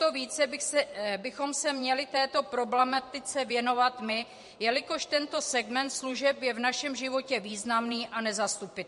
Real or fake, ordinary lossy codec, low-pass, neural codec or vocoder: fake; MP3, 48 kbps; 14.4 kHz; vocoder, 44.1 kHz, 128 mel bands every 512 samples, BigVGAN v2